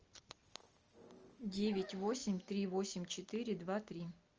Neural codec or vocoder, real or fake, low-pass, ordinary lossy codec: none; real; 7.2 kHz; Opus, 24 kbps